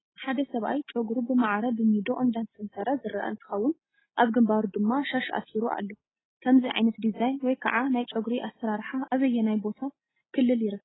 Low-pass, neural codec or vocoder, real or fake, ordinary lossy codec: 7.2 kHz; none; real; AAC, 16 kbps